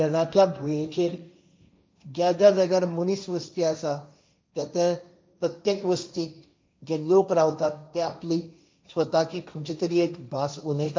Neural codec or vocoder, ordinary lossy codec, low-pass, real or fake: codec, 16 kHz, 1.1 kbps, Voila-Tokenizer; none; none; fake